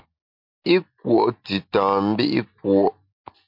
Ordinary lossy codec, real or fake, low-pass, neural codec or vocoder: MP3, 48 kbps; real; 5.4 kHz; none